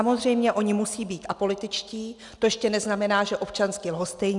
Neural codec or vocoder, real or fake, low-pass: none; real; 10.8 kHz